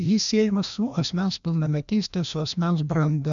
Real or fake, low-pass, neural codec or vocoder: fake; 7.2 kHz; codec, 16 kHz, 1 kbps, FreqCodec, larger model